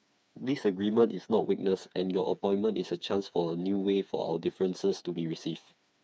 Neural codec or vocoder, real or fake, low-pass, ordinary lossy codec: codec, 16 kHz, 4 kbps, FreqCodec, smaller model; fake; none; none